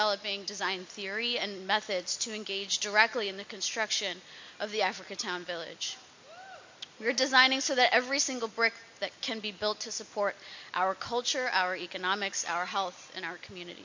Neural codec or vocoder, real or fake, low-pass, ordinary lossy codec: none; real; 7.2 kHz; MP3, 48 kbps